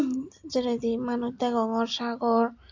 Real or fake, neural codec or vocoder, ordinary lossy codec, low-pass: real; none; AAC, 48 kbps; 7.2 kHz